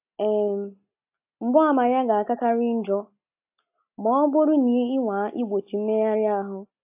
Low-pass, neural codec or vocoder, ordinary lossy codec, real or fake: 3.6 kHz; none; AAC, 32 kbps; real